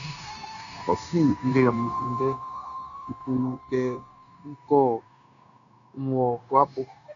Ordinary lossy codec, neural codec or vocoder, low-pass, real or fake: MP3, 96 kbps; codec, 16 kHz, 0.9 kbps, LongCat-Audio-Codec; 7.2 kHz; fake